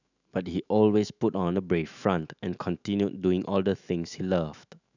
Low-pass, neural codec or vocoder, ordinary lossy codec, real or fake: 7.2 kHz; autoencoder, 48 kHz, 128 numbers a frame, DAC-VAE, trained on Japanese speech; none; fake